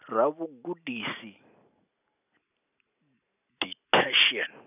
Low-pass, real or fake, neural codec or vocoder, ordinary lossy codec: 3.6 kHz; real; none; none